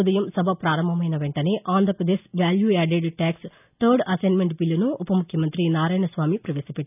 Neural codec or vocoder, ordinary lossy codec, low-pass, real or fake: none; none; 3.6 kHz; real